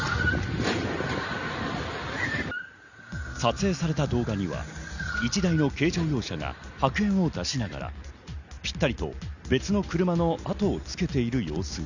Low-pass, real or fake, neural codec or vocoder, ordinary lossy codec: 7.2 kHz; real; none; none